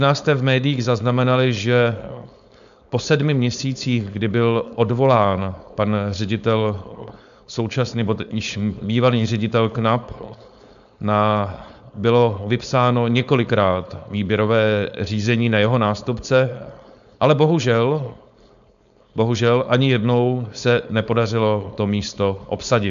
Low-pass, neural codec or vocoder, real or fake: 7.2 kHz; codec, 16 kHz, 4.8 kbps, FACodec; fake